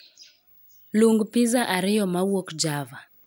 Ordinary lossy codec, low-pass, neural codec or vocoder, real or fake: none; none; none; real